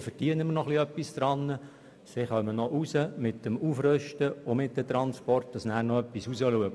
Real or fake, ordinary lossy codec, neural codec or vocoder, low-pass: real; none; none; none